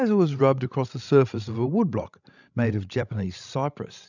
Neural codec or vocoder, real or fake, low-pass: codec, 16 kHz, 16 kbps, FreqCodec, larger model; fake; 7.2 kHz